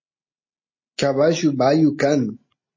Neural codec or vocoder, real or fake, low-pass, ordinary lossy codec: none; real; 7.2 kHz; MP3, 32 kbps